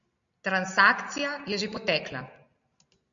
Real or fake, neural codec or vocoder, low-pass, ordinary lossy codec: real; none; 7.2 kHz; MP3, 64 kbps